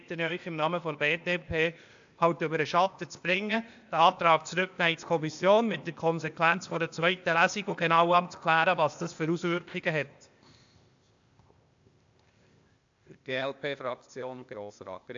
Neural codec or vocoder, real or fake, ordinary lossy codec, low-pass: codec, 16 kHz, 0.8 kbps, ZipCodec; fake; AAC, 64 kbps; 7.2 kHz